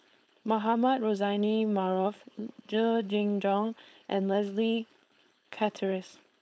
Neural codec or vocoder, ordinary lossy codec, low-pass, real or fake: codec, 16 kHz, 4.8 kbps, FACodec; none; none; fake